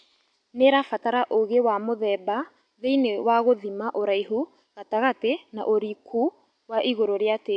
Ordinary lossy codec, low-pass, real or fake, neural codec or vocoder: AAC, 64 kbps; 9.9 kHz; real; none